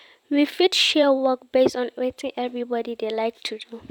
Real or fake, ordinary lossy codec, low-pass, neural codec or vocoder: fake; none; 19.8 kHz; vocoder, 44.1 kHz, 128 mel bands every 512 samples, BigVGAN v2